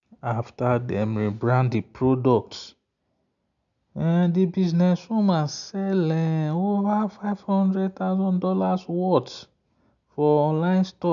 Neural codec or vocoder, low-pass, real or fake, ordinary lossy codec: none; 7.2 kHz; real; none